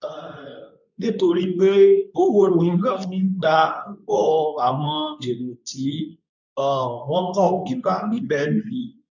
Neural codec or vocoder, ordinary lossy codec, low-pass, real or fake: codec, 24 kHz, 0.9 kbps, WavTokenizer, medium speech release version 2; none; 7.2 kHz; fake